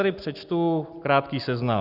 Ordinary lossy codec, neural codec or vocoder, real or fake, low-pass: Opus, 64 kbps; none; real; 5.4 kHz